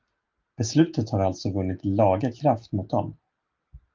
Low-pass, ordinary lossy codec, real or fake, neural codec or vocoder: 7.2 kHz; Opus, 32 kbps; real; none